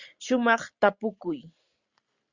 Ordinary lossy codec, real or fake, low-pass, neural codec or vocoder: Opus, 64 kbps; real; 7.2 kHz; none